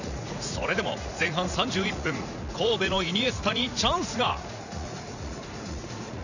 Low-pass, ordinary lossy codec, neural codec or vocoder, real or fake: 7.2 kHz; none; vocoder, 44.1 kHz, 80 mel bands, Vocos; fake